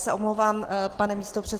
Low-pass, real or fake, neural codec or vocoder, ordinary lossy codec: 14.4 kHz; real; none; Opus, 16 kbps